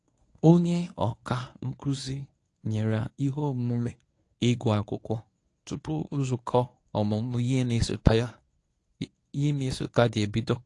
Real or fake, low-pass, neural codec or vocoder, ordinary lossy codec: fake; 10.8 kHz; codec, 24 kHz, 0.9 kbps, WavTokenizer, medium speech release version 1; AAC, 48 kbps